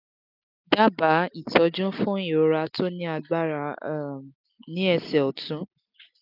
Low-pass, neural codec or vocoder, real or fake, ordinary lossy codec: 5.4 kHz; none; real; none